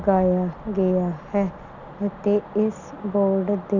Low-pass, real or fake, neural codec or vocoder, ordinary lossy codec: 7.2 kHz; real; none; none